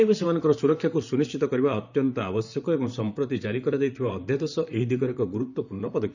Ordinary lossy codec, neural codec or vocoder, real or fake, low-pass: none; vocoder, 44.1 kHz, 128 mel bands, Pupu-Vocoder; fake; 7.2 kHz